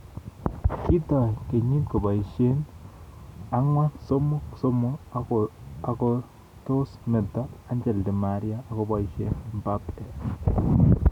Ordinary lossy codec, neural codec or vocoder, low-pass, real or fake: none; none; 19.8 kHz; real